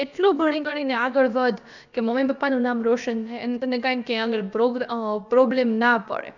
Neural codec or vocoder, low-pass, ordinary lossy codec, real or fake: codec, 16 kHz, about 1 kbps, DyCAST, with the encoder's durations; 7.2 kHz; none; fake